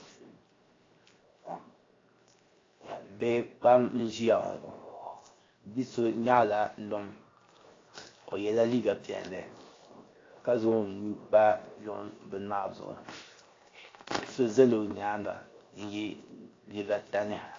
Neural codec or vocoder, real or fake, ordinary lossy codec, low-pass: codec, 16 kHz, 0.7 kbps, FocalCodec; fake; AAC, 32 kbps; 7.2 kHz